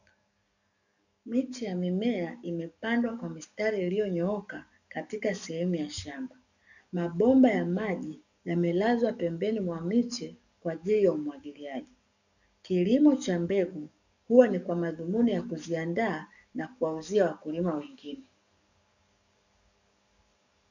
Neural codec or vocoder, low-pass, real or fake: codec, 44.1 kHz, 7.8 kbps, DAC; 7.2 kHz; fake